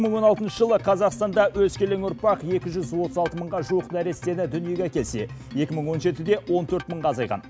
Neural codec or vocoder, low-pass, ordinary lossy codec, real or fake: none; none; none; real